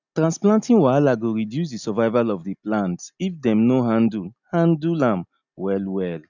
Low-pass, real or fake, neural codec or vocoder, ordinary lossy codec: 7.2 kHz; real; none; none